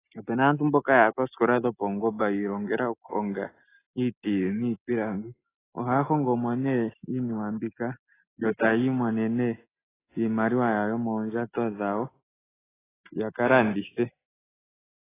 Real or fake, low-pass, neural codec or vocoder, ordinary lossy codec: real; 3.6 kHz; none; AAC, 16 kbps